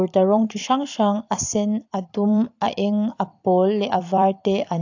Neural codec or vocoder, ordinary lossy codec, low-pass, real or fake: vocoder, 44.1 kHz, 128 mel bands every 256 samples, BigVGAN v2; AAC, 48 kbps; 7.2 kHz; fake